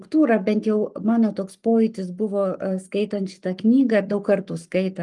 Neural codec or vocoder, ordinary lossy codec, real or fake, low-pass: codec, 44.1 kHz, 7.8 kbps, Pupu-Codec; Opus, 32 kbps; fake; 10.8 kHz